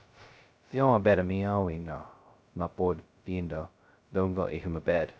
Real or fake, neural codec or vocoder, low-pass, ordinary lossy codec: fake; codec, 16 kHz, 0.2 kbps, FocalCodec; none; none